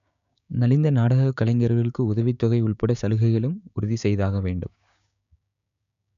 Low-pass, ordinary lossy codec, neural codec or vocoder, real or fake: 7.2 kHz; none; codec, 16 kHz, 6 kbps, DAC; fake